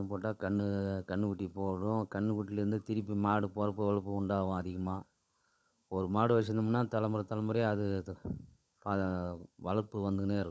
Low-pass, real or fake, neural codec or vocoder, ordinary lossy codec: none; fake; codec, 16 kHz, 16 kbps, FunCodec, trained on LibriTTS, 50 frames a second; none